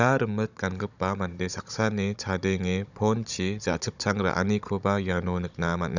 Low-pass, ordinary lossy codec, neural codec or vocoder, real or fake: 7.2 kHz; none; codec, 16 kHz, 16 kbps, FunCodec, trained on Chinese and English, 50 frames a second; fake